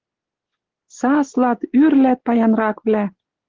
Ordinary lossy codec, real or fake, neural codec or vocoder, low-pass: Opus, 16 kbps; real; none; 7.2 kHz